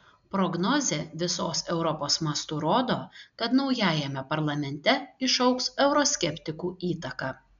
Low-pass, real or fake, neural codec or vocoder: 7.2 kHz; real; none